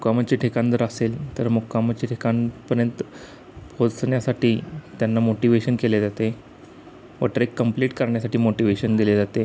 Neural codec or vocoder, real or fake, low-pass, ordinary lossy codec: none; real; none; none